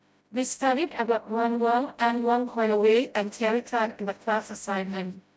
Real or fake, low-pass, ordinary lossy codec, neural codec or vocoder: fake; none; none; codec, 16 kHz, 0.5 kbps, FreqCodec, smaller model